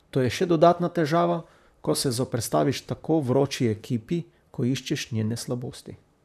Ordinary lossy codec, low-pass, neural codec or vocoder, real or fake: none; 14.4 kHz; vocoder, 44.1 kHz, 128 mel bands, Pupu-Vocoder; fake